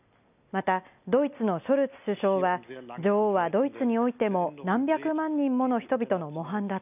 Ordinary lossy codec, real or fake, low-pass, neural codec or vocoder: none; real; 3.6 kHz; none